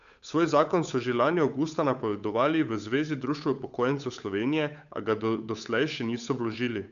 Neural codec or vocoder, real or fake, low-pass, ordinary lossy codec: codec, 16 kHz, 8 kbps, FunCodec, trained on Chinese and English, 25 frames a second; fake; 7.2 kHz; none